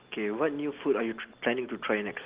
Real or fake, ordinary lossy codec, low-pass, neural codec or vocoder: real; Opus, 16 kbps; 3.6 kHz; none